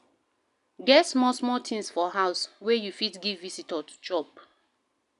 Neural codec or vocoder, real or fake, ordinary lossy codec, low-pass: none; real; none; 10.8 kHz